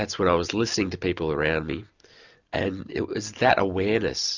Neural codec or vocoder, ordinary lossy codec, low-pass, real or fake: none; Opus, 64 kbps; 7.2 kHz; real